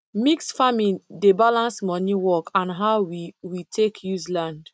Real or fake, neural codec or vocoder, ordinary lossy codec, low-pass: real; none; none; none